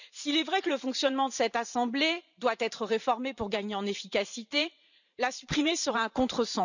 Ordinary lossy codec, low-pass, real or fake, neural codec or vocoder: none; 7.2 kHz; fake; vocoder, 44.1 kHz, 128 mel bands every 512 samples, BigVGAN v2